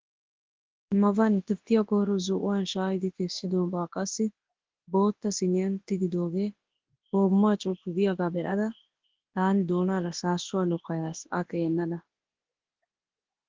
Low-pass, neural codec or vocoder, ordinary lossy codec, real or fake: 7.2 kHz; codec, 24 kHz, 0.9 kbps, WavTokenizer, large speech release; Opus, 16 kbps; fake